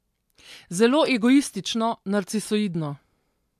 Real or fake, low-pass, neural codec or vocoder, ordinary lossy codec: real; 14.4 kHz; none; AAC, 96 kbps